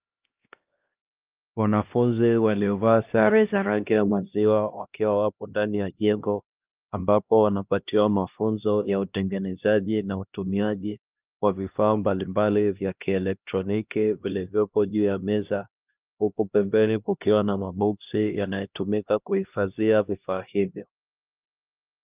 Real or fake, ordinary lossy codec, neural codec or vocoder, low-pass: fake; Opus, 24 kbps; codec, 16 kHz, 1 kbps, X-Codec, HuBERT features, trained on LibriSpeech; 3.6 kHz